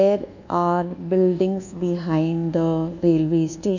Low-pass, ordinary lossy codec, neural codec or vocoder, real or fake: 7.2 kHz; none; codec, 24 kHz, 1.2 kbps, DualCodec; fake